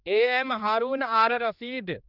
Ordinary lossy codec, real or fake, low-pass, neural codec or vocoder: none; fake; 5.4 kHz; codec, 16 kHz, 2 kbps, X-Codec, HuBERT features, trained on general audio